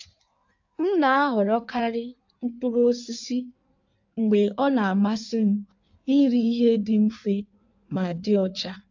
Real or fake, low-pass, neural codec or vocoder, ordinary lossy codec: fake; 7.2 kHz; codec, 16 kHz in and 24 kHz out, 1.1 kbps, FireRedTTS-2 codec; AAC, 48 kbps